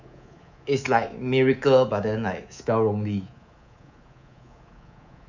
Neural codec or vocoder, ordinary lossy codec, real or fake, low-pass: codec, 24 kHz, 3.1 kbps, DualCodec; none; fake; 7.2 kHz